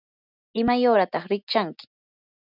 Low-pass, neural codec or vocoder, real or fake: 5.4 kHz; none; real